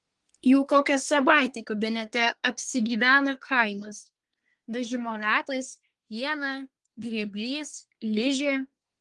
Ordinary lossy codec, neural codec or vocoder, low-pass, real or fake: Opus, 24 kbps; codec, 24 kHz, 1 kbps, SNAC; 10.8 kHz; fake